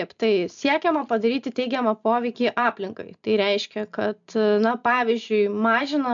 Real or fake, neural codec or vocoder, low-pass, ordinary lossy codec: real; none; 7.2 kHz; MP3, 64 kbps